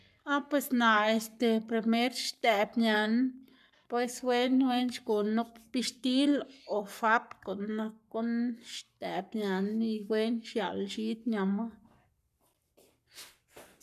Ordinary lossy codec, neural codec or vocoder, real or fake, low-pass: none; codec, 44.1 kHz, 7.8 kbps, Pupu-Codec; fake; 14.4 kHz